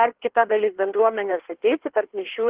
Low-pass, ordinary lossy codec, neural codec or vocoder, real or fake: 3.6 kHz; Opus, 16 kbps; codec, 16 kHz in and 24 kHz out, 1.1 kbps, FireRedTTS-2 codec; fake